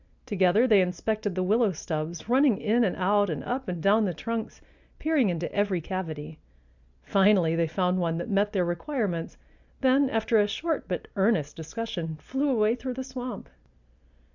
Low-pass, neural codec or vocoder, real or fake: 7.2 kHz; none; real